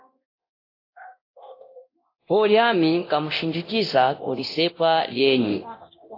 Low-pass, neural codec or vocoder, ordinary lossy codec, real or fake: 5.4 kHz; codec, 24 kHz, 0.9 kbps, DualCodec; AAC, 32 kbps; fake